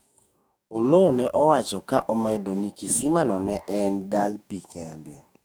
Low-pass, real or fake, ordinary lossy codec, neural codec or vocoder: none; fake; none; codec, 44.1 kHz, 2.6 kbps, DAC